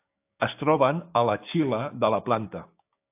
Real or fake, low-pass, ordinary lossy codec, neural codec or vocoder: fake; 3.6 kHz; AAC, 32 kbps; codec, 16 kHz, 6 kbps, DAC